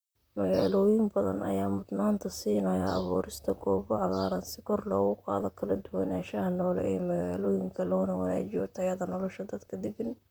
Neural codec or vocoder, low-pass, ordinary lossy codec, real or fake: vocoder, 44.1 kHz, 128 mel bands, Pupu-Vocoder; none; none; fake